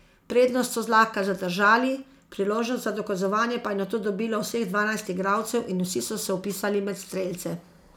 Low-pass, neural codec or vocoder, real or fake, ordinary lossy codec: none; none; real; none